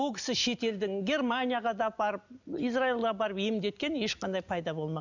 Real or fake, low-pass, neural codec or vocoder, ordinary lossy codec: real; 7.2 kHz; none; none